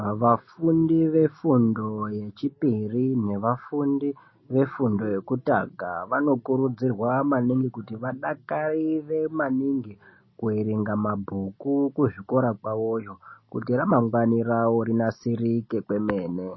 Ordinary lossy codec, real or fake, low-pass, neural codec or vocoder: MP3, 24 kbps; real; 7.2 kHz; none